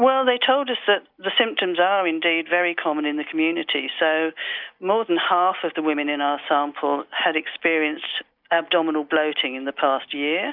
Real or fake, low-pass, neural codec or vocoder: real; 5.4 kHz; none